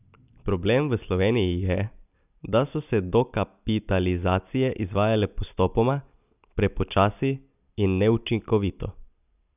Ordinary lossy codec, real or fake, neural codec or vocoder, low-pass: none; real; none; 3.6 kHz